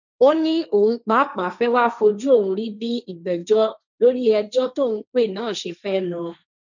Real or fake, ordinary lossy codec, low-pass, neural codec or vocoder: fake; none; 7.2 kHz; codec, 16 kHz, 1.1 kbps, Voila-Tokenizer